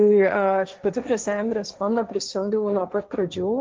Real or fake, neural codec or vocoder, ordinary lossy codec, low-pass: fake; codec, 16 kHz, 1.1 kbps, Voila-Tokenizer; Opus, 24 kbps; 7.2 kHz